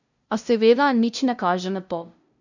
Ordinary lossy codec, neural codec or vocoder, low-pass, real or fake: none; codec, 16 kHz, 0.5 kbps, FunCodec, trained on LibriTTS, 25 frames a second; 7.2 kHz; fake